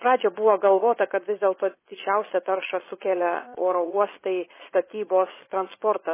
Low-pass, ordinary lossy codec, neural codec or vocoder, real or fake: 3.6 kHz; MP3, 16 kbps; vocoder, 22.05 kHz, 80 mel bands, Vocos; fake